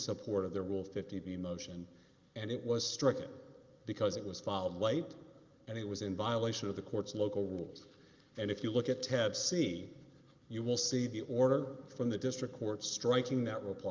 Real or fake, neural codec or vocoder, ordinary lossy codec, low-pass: real; none; Opus, 24 kbps; 7.2 kHz